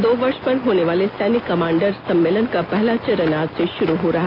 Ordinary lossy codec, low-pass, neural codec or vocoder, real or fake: none; 5.4 kHz; none; real